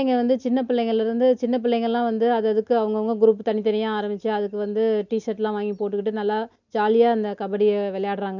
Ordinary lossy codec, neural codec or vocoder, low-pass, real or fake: none; none; 7.2 kHz; real